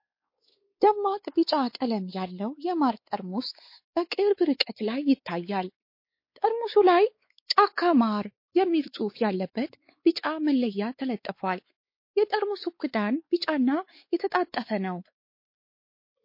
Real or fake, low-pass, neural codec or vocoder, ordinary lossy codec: fake; 5.4 kHz; codec, 16 kHz, 4 kbps, X-Codec, WavLM features, trained on Multilingual LibriSpeech; MP3, 32 kbps